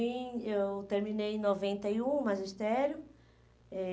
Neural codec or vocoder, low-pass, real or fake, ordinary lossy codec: none; none; real; none